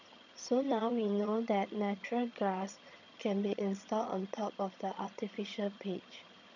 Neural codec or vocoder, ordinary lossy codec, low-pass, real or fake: vocoder, 22.05 kHz, 80 mel bands, HiFi-GAN; none; 7.2 kHz; fake